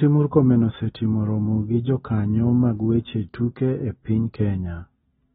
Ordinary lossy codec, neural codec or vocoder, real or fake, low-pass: AAC, 16 kbps; none; real; 19.8 kHz